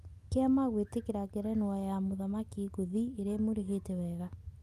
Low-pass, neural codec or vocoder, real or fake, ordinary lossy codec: 14.4 kHz; none; real; Opus, 32 kbps